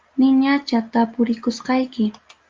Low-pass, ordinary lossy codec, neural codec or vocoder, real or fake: 7.2 kHz; Opus, 32 kbps; none; real